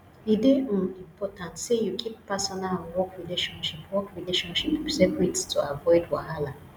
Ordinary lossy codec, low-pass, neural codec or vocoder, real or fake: none; none; none; real